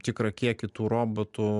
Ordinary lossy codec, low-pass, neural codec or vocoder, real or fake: MP3, 96 kbps; 10.8 kHz; none; real